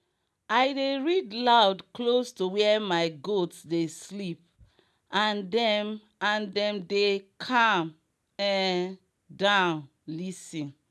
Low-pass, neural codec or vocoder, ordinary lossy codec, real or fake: none; none; none; real